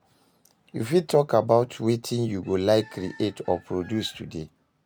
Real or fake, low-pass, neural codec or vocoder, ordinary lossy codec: real; none; none; none